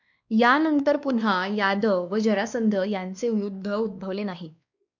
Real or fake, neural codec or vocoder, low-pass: fake; codec, 16 kHz, 2 kbps, X-Codec, WavLM features, trained on Multilingual LibriSpeech; 7.2 kHz